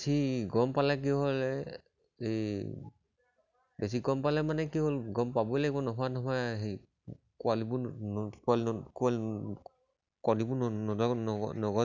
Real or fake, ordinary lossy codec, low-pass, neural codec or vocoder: real; none; 7.2 kHz; none